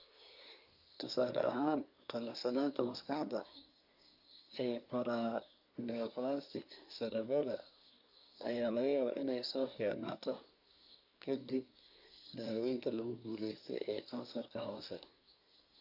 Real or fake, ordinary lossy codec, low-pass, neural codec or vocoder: fake; none; 5.4 kHz; codec, 24 kHz, 1 kbps, SNAC